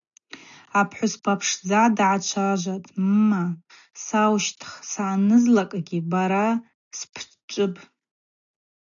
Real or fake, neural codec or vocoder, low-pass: real; none; 7.2 kHz